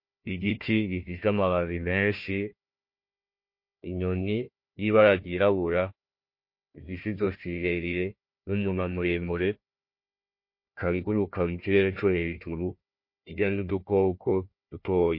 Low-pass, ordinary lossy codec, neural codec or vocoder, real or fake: 5.4 kHz; MP3, 32 kbps; codec, 16 kHz, 1 kbps, FunCodec, trained on Chinese and English, 50 frames a second; fake